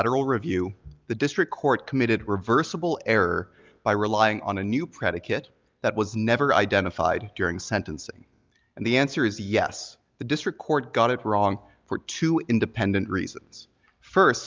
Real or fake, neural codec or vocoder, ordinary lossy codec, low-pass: real; none; Opus, 24 kbps; 7.2 kHz